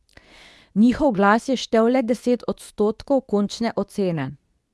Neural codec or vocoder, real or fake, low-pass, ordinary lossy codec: codec, 24 kHz, 0.9 kbps, WavTokenizer, medium speech release version 2; fake; none; none